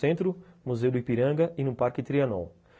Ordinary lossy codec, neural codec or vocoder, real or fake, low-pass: none; none; real; none